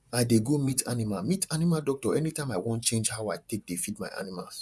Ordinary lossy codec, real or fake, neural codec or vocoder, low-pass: none; fake; vocoder, 24 kHz, 100 mel bands, Vocos; none